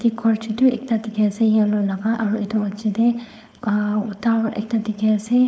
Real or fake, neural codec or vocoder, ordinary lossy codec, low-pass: fake; codec, 16 kHz, 4.8 kbps, FACodec; none; none